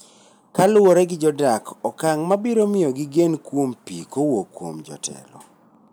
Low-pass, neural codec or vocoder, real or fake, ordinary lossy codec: none; none; real; none